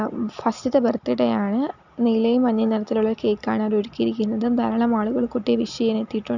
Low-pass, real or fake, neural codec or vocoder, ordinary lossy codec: 7.2 kHz; real; none; none